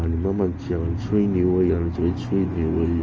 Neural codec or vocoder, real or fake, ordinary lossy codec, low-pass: none; real; Opus, 24 kbps; 7.2 kHz